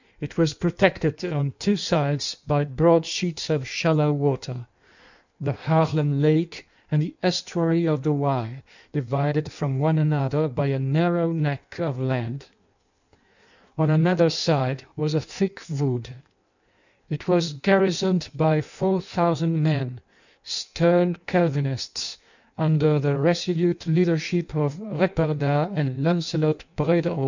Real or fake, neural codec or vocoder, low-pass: fake; codec, 16 kHz in and 24 kHz out, 1.1 kbps, FireRedTTS-2 codec; 7.2 kHz